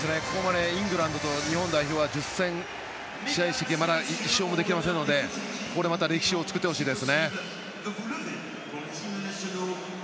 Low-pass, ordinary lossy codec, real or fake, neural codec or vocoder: none; none; real; none